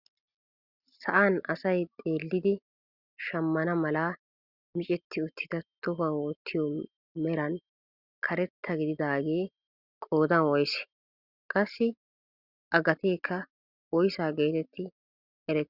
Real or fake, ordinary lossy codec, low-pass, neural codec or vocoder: real; Opus, 64 kbps; 5.4 kHz; none